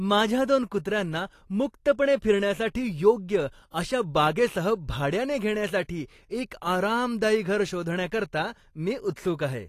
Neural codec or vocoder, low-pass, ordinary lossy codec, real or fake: none; 14.4 kHz; AAC, 48 kbps; real